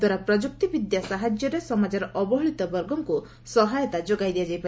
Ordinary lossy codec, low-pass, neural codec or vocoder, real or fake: none; none; none; real